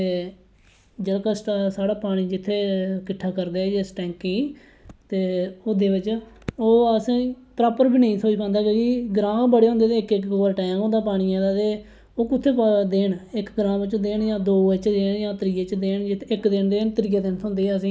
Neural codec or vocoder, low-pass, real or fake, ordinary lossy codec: none; none; real; none